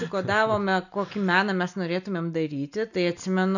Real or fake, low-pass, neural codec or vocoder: real; 7.2 kHz; none